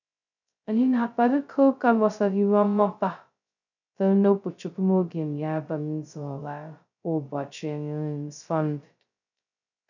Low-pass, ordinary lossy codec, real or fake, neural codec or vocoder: 7.2 kHz; none; fake; codec, 16 kHz, 0.2 kbps, FocalCodec